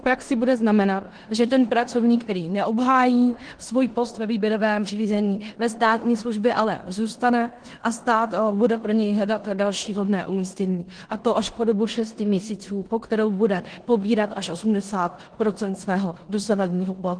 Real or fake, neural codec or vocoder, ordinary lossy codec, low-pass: fake; codec, 16 kHz in and 24 kHz out, 0.9 kbps, LongCat-Audio-Codec, four codebook decoder; Opus, 16 kbps; 9.9 kHz